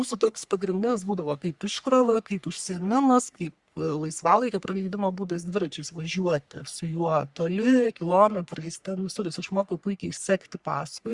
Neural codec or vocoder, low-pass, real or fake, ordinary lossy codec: codec, 44.1 kHz, 3.4 kbps, Pupu-Codec; 10.8 kHz; fake; Opus, 64 kbps